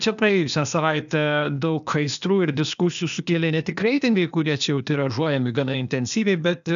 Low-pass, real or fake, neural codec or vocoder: 7.2 kHz; fake; codec, 16 kHz, 0.8 kbps, ZipCodec